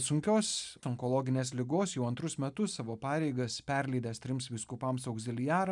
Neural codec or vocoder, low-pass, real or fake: none; 10.8 kHz; real